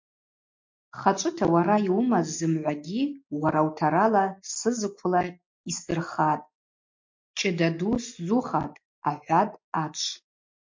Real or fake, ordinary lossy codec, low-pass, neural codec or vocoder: real; MP3, 48 kbps; 7.2 kHz; none